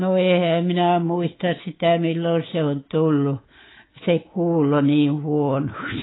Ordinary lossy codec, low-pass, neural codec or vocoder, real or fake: AAC, 16 kbps; 7.2 kHz; codec, 24 kHz, 3.1 kbps, DualCodec; fake